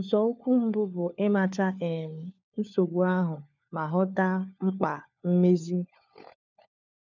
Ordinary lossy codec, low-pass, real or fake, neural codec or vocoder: none; 7.2 kHz; fake; codec, 16 kHz, 4 kbps, FunCodec, trained on LibriTTS, 50 frames a second